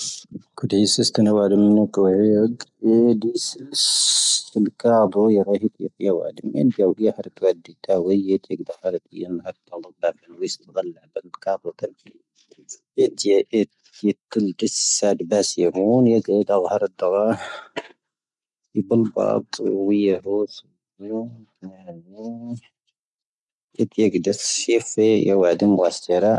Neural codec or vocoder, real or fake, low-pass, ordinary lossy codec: none; real; 10.8 kHz; none